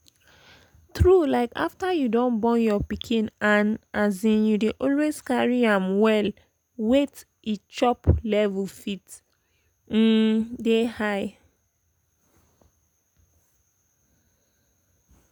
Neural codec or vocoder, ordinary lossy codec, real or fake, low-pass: none; none; real; 19.8 kHz